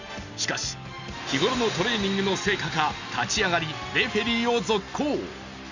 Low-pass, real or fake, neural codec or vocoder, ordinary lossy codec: 7.2 kHz; real; none; none